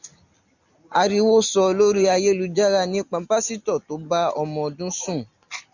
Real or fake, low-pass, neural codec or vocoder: real; 7.2 kHz; none